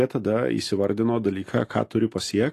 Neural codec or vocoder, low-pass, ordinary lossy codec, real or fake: none; 14.4 kHz; AAC, 48 kbps; real